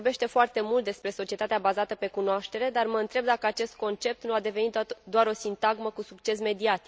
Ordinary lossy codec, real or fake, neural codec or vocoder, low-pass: none; real; none; none